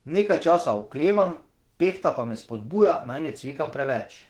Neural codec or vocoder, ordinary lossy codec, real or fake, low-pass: autoencoder, 48 kHz, 32 numbers a frame, DAC-VAE, trained on Japanese speech; Opus, 16 kbps; fake; 19.8 kHz